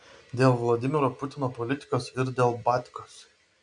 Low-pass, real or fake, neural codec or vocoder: 9.9 kHz; real; none